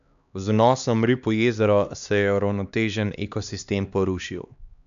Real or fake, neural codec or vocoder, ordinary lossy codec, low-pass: fake; codec, 16 kHz, 2 kbps, X-Codec, HuBERT features, trained on LibriSpeech; none; 7.2 kHz